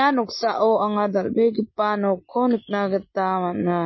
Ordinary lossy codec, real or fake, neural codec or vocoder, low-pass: MP3, 24 kbps; real; none; 7.2 kHz